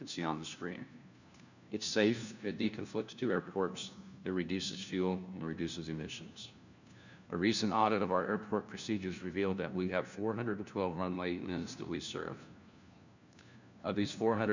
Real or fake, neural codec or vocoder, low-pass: fake; codec, 16 kHz, 1 kbps, FunCodec, trained on LibriTTS, 50 frames a second; 7.2 kHz